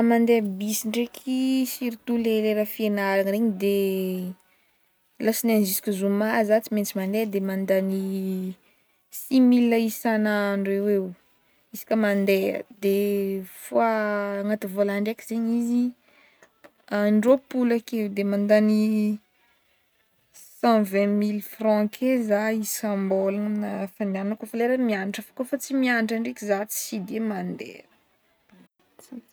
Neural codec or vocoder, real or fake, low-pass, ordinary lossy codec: none; real; none; none